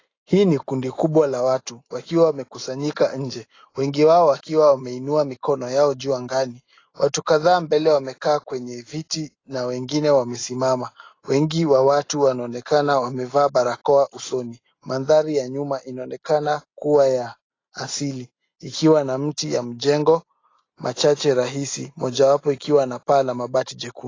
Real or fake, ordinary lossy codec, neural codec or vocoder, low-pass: real; AAC, 32 kbps; none; 7.2 kHz